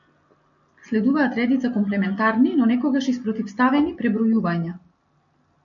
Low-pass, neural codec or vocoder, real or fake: 7.2 kHz; none; real